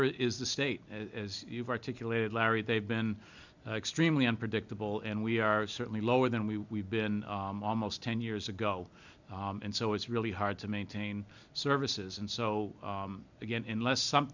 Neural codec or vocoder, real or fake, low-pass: none; real; 7.2 kHz